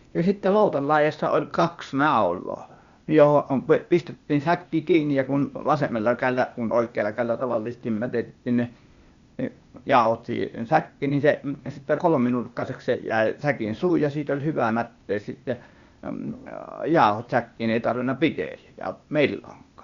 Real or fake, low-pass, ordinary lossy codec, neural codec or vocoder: fake; 7.2 kHz; Opus, 64 kbps; codec, 16 kHz, 0.8 kbps, ZipCodec